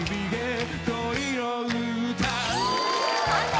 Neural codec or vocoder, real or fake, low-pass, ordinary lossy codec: none; real; none; none